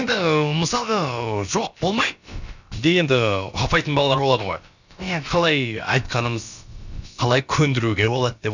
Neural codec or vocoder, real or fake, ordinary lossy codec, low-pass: codec, 16 kHz, about 1 kbps, DyCAST, with the encoder's durations; fake; none; 7.2 kHz